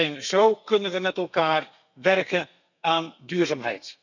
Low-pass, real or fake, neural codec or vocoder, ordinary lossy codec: 7.2 kHz; fake; codec, 32 kHz, 1.9 kbps, SNAC; none